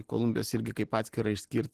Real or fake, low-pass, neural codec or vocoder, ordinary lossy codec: real; 14.4 kHz; none; Opus, 16 kbps